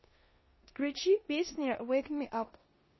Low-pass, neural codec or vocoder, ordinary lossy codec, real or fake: 7.2 kHz; codec, 16 kHz in and 24 kHz out, 0.9 kbps, LongCat-Audio-Codec, four codebook decoder; MP3, 24 kbps; fake